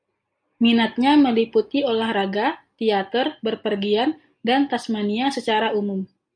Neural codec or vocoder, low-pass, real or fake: none; 9.9 kHz; real